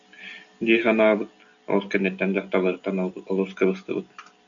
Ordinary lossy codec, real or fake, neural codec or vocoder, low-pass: MP3, 96 kbps; real; none; 7.2 kHz